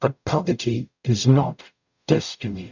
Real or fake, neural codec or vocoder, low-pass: fake; codec, 44.1 kHz, 0.9 kbps, DAC; 7.2 kHz